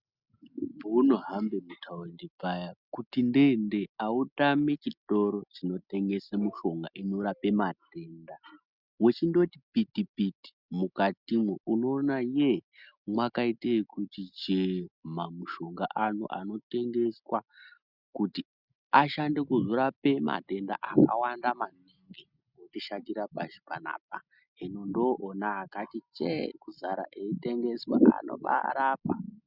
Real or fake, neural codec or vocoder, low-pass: real; none; 5.4 kHz